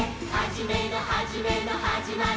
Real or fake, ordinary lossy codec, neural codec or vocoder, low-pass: real; none; none; none